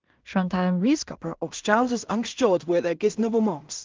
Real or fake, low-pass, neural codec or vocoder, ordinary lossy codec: fake; 7.2 kHz; codec, 16 kHz in and 24 kHz out, 0.4 kbps, LongCat-Audio-Codec, two codebook decoder; Opus, 32 kbps